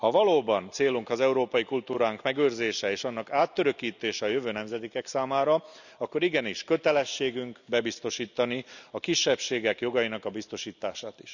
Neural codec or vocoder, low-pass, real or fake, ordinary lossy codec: none; 7.2 kHz; real; none